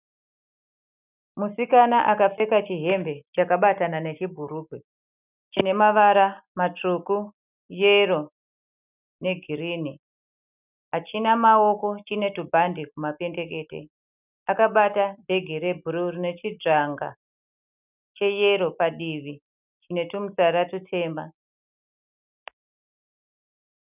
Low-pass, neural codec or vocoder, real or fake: 3.6 kHz; none; real